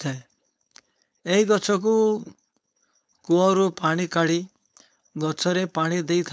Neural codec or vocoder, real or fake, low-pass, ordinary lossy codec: codec, 16 kHz, 4.8 kbps, FACodec; fake; none; none